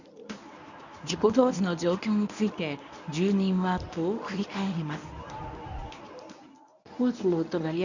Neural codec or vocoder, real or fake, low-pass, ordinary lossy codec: codec, 24 kHz, 0.9 kbps, WavTokenizer, medium speech release version 1; fake; 7.2 kHz; none